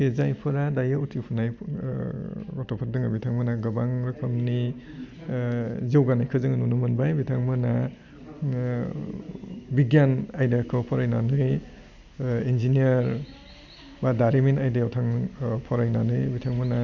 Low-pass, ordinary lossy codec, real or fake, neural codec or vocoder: 7.2 kHz; Opus, 64 kbps; real; none